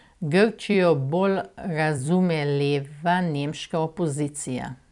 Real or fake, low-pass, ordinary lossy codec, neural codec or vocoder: fake; 10.8 kHz; none; vocoder, 44.1 kHz, 128 mel bands every 256 samples, BigVGAN v2